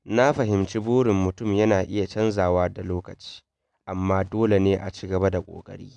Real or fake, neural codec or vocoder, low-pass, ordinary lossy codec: real; none; 10.8 kHz; none